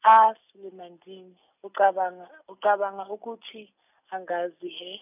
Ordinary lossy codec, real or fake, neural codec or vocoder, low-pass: none; real; none; 3.6 kHz